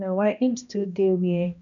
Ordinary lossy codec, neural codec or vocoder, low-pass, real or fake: none; codec, 16 kHz, 1 kbps, X-Codec, HuBERT features, trained on balanced general audio; 7.2 kHz; fake